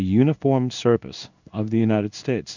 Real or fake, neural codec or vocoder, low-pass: fake; codec, 16 kHz in and 24 kHz out, 1 kbps, XY-Tokenizer; 7.2 kHz